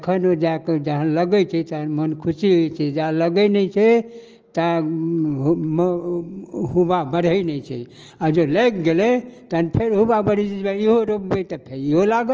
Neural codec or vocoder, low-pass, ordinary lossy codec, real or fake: none; 7.2 kHz; Opus, 24 kbps; real